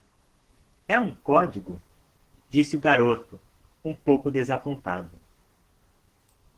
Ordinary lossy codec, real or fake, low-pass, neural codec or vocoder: Opus, 16 kbps; fake; 14.4 kHz; codec, 44.1 kHz, 2.6 kbps, SNAC